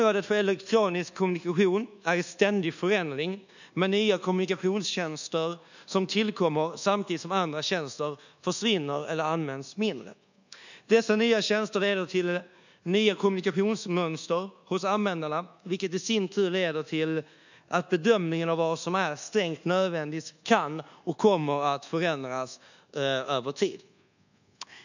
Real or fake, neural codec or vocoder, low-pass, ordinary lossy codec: fake; codec, 24 kHz, 1.2 kbps, DualCodec; 7.2 kHz; none